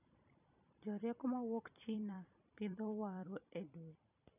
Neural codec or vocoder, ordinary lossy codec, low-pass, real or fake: vocoder, 44.1 kHz, 128 mel bands every 256 samples, BigVGAN v2; none; 3.6 kHz; fake